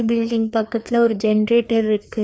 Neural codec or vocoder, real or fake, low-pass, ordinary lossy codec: codec, 16 kHz, 2 kbps, FreqCodec, larger model; fake; none; none